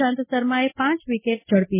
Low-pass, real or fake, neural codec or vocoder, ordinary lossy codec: 3.6 kHz; real; none; MP3, 16 kbps